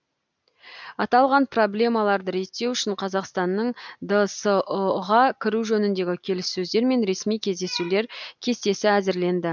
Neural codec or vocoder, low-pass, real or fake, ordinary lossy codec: none; 7.2 kHz; real; none